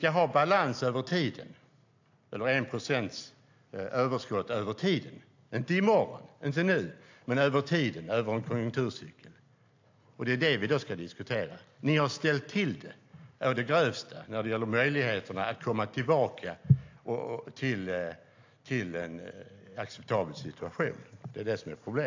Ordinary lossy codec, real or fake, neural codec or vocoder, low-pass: AAC, 48 kbps; real; none; 7.2 kHz